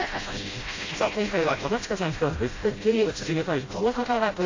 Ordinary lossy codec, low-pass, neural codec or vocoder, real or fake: AAC, 32 kbps; 7.2 kHz; codec, 16 kHz, 0.5 kbps, FreqCodec, smaller model; fake